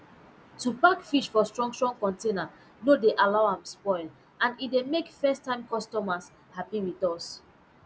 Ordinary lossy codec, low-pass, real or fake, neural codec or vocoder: none; none; real; none